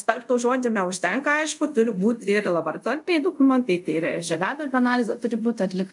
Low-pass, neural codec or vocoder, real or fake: 10.8 kHz; codec, 24 kHz, 0.5 kbps, DualCodec; fake